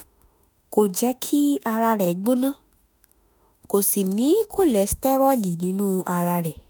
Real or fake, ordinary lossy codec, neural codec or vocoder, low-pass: fake; none; autoencoder, 48 kHz, 32 numbers a frame, DAC-VAE, trained on Japanese speech; none